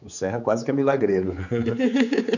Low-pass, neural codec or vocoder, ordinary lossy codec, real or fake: 7.2 kHz; codec, 16 kHz, 4 kbps, X-Codec, HuBERT features, trained on general audio; none; fake